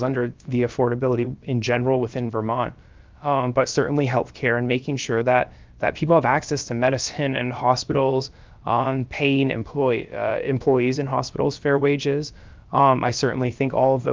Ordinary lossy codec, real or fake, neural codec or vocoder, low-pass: Opus, 24 kbps; fake; codec, 16 kHz, about 1 kbps, DyCAST, with the encoder's durations; 7.2 kHz